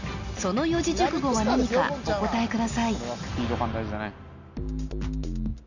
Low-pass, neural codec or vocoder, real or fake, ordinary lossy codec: 7.2 kHz; none; real; none